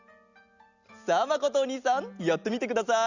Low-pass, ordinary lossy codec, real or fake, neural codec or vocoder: 7.2 kHz; Opus, 64 kbps; real; none